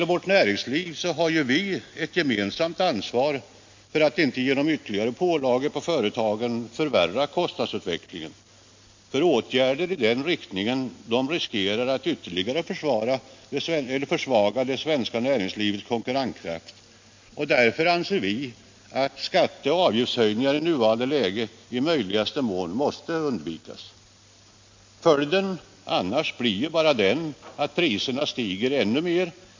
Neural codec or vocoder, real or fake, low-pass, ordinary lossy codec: none; real; 7.2 kHz; MP3, 48 kbps